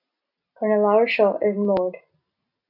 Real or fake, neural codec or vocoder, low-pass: real; none; 5.4 kHz